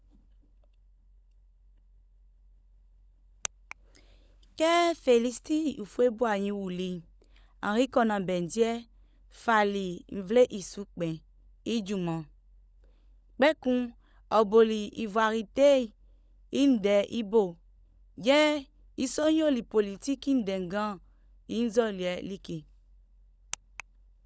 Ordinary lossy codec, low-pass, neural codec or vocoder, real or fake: none; none; codec, 16 kHz, 16 kbps, FunCodec, trained on LibriTTS, 50 frames a second; fake